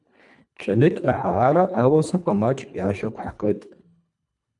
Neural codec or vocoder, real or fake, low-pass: codec, 24 kHz, 1.5 kbps, HILCodec; fake; 10.8 kHz